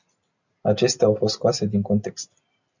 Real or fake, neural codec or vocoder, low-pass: real; none; 7.2 kHz